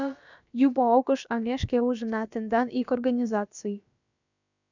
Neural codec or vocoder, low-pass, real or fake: codec, 16 kHz, about 1 kbps, DyCAST, with the encoder's durations; 7.2 kHz; fake